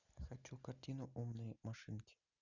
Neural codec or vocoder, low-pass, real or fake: none; 7.2 kHz; real